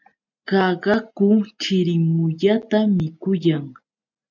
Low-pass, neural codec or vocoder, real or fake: 7.2 kHz; none; real